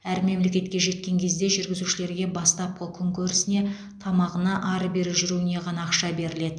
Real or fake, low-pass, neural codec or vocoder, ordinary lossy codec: real; 9.9 kHz; none; MP3, 96 kbps